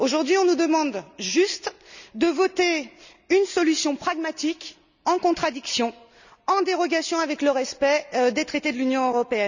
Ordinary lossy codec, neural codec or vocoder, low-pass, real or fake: none; none; 7.2 kHz; real